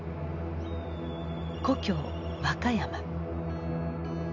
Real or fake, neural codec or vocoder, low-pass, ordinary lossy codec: real; none; 7.2 kHz; none